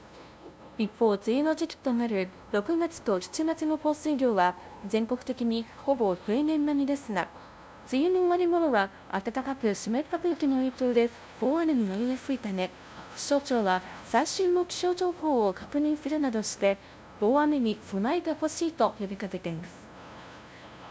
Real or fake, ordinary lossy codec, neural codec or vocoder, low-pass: fake; none; codec, 16 kHz, 0.5 kbps, FunCodec, trained on LibriTTS, 25 frames a second; none